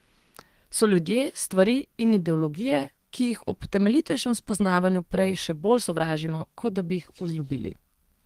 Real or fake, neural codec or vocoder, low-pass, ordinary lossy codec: fake; codec, 32 kHz, 1.9 kbps, SNAC; 14.4 kHz; Opus, 24 kbps